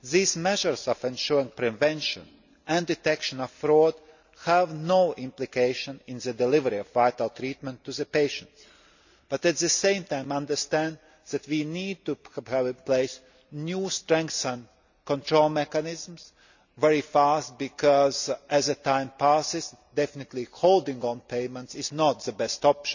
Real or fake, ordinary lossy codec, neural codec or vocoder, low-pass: real; none; none; 7.2 kHz